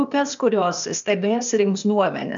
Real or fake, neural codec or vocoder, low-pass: fake; codec, 16 kHz, 0.8 kbps, ZipCodec; 7.2 kHz